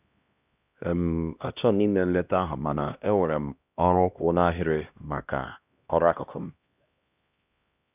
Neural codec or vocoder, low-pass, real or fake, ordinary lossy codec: codec, 16 kHz, 1 kbps, X-Codec, HuBERT features, trained on LibriSpeech; 3.6 kHz; fake; none